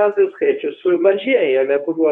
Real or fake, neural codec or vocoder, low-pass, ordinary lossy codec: fake; codec, 24 kHz, 0.9 kbps, WavTokenizer, medium speech release version 1; 10.8 kHz; MP3, 64 kbps